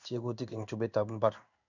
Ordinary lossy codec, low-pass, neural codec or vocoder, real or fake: none; 7.2 kHz; codec, 16 kHz in and 24 kHz out, 1 kbps, XY-Tokenizer; fake